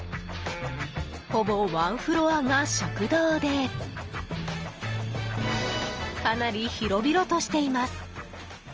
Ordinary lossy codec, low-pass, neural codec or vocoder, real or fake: Opus, 24 kbps; 7.2 kHz; none; real